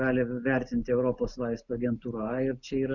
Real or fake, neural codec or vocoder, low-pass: real; none; 7.2 kHz